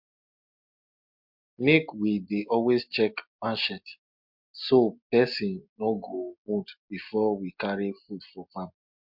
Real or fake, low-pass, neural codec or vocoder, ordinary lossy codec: real; 5.4 kHz; none; MP3, 48 kbps